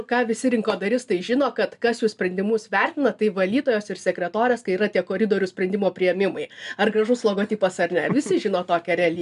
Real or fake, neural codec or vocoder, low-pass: real; none; 10.8 kHz